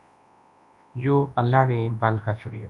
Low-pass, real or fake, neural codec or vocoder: 10.8 kHz; fake; codec, 24 kHz, 0.9 kbps, WavTokenizer, large speech release